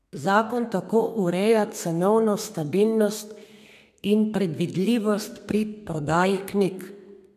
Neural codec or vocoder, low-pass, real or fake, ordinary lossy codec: codec, 32 kHz, 1.9 kbps, SNAC; 14.4 kHz; fake; none